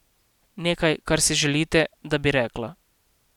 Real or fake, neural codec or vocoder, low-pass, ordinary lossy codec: real; none; 19.8 kHz; none